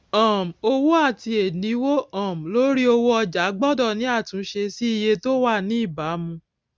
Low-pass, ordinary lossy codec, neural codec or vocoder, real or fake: none; none; none; real